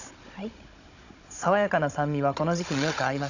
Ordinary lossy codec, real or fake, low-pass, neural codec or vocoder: none; fake; 7.2 kHz; codec, 16 kHz, 16 kbps, FunCodec, trained on Chinese and English, 50 frames a second